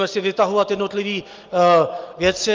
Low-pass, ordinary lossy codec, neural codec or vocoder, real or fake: 7.2 kHz; Opus, 32 kbps; none; real